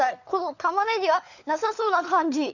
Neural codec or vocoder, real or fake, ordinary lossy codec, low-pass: codec, 16 kHz, 4 kbps, FunCodec, trained on LibriTTS, 50 frames a second; fake; none; 7.2 kHz